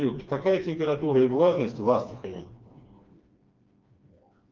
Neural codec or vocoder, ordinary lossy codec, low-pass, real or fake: codec, 16 kHz, 2 kbps, FreqCodec, smaller model; Opus, 24 kbps; 7.2 kHz; fake